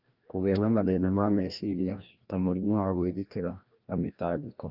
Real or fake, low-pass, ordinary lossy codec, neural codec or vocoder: fake; 5.4 kHz; Opus, 32 kbps; codec, 16 kHz, 1 kbps, FreqCodec, larger model